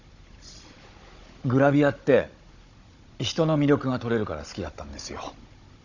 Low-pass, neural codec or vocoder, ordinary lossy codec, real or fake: 7.2 kHz; codec, 16 kHz, 16 kbps, FunCodec, trained on Chinese and English, 50 frames a second; none; fake